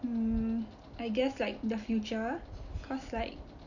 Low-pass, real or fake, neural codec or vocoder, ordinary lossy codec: 7.2 kHz; real; none; none